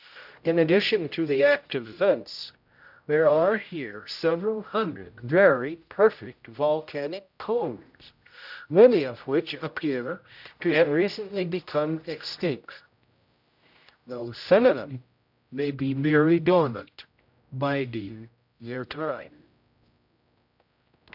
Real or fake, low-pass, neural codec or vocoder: fake; 5.4 kHz; codec, 16 kHz, 0.5 kbps, X-Codec, HuBERT features, trained on general audio